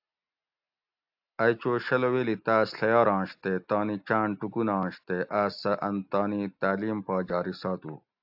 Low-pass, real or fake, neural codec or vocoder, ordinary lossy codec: 5.4 kHz; real; none; AAC, 48 kbps